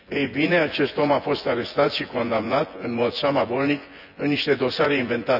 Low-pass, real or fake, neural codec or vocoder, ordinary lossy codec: 5.4 kHz; fake; vocoder, 24 kHz, 100 mel bands, Vocos; none